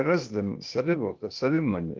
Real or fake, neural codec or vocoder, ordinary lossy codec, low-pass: fake; codec, 16 kHz, 0.8 kbps, ZipCodec; Opus, 24 kbps; 7.2 kHz